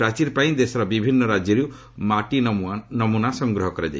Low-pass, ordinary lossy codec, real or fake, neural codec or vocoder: none; none; real; none